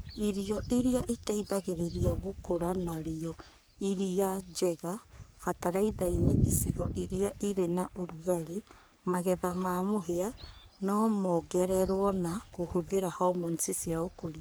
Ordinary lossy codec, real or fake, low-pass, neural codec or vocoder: none; fake; none; codec, 44.1 kHz, 3.4 kbps, Pupu-Codec